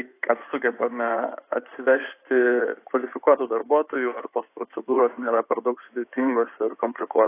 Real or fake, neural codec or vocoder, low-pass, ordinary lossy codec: fake; codec, 16 kHz in and 24 kHz out, 2.2 kbps, FireRedTTS-2 codec; 3.6 kHz; AAC, 24 kbps